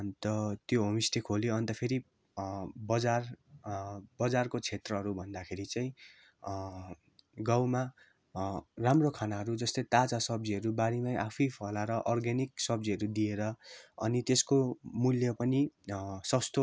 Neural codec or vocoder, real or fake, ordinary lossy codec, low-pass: none; real; none; none